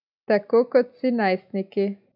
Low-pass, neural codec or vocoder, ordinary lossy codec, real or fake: 5.4 kHz; none; none; real